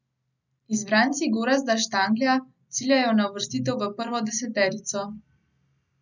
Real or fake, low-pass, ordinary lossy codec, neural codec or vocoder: real; 7.2 kHz; none; none